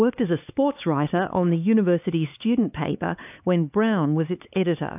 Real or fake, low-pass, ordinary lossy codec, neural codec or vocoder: fake; 3.6 kHz; AAC, 32 kbps; codec, 16 kHz, 4 kbps, X-Codec, HuBERT features, trained on LibriSpeech